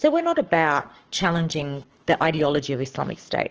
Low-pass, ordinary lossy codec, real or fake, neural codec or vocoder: 7.2 kHz; Opus, 16 kbps; fake; codec, 44.1 kHz, 7.8 kbps, Pupu-Codec